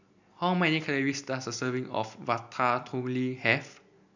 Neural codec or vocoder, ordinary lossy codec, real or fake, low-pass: none; none; real; 7.2 kHz